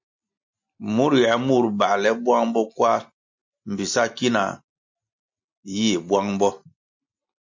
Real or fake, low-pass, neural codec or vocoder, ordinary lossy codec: real; 7.2 kHz; none; MP3, 48 kbps